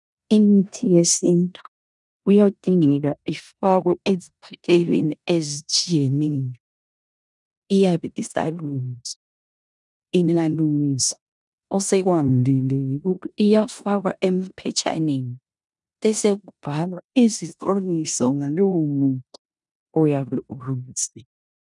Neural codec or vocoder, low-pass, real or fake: codec, 16 kHz in and 24 kHz out, 0.9 kbps, LongCat-Audio-Codec, four codebook decoder; 10.8 kHz; fake